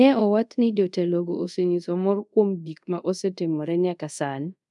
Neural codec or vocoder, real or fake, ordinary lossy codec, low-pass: codec, 24 kHz, 0.5 kbps, DualCodec; fake; none; 10.8 kHz